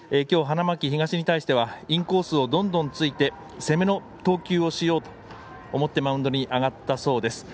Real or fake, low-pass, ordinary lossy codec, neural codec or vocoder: real; none; none; none